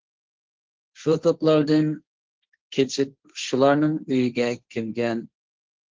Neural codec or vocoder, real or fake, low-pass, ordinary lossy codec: codec, 16 kHz, 1.1 kbps, Voila-Tokenizer; fake; 7.2 kHz; Opus, 16 kbps